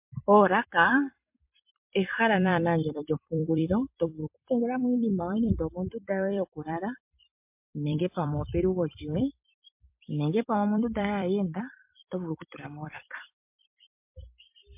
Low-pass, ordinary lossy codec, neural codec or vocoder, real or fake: 3.6 kHz; MP3, 32 kbps; vocoder, 44.1 kHz, 128 mel bands every 256 samples, BigVGAN v2; fake